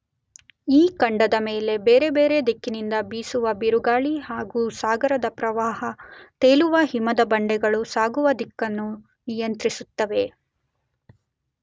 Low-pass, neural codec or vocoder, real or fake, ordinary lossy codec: none; none; real; none